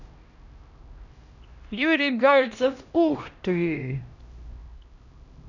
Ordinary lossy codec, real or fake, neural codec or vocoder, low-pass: none; fake; codec, 16 kHz, 1 kbps, X-Codec, HuBERT features, trained on LibriSpeech; 7.2 kHz